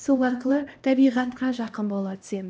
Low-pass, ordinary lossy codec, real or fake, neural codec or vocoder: none; none; fake; codec, 16 kHz, 1 kbps, X-Codec, HuBERT features, trained on LibriSpeech